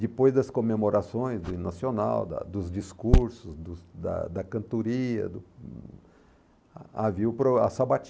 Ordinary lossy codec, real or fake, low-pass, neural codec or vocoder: none; real; none; none